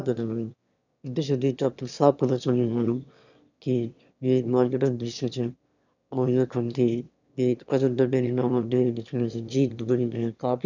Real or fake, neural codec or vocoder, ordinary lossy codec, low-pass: fake; autoencoder, 22.05 kHz, a latent of 192 numbers a frame, VITS, trained on one speaker; none; 7.2 kHz